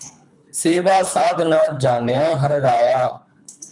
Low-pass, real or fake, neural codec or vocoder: 10.8 kHz; fake; codec, 24 kHz, 3 kbps, HILCodec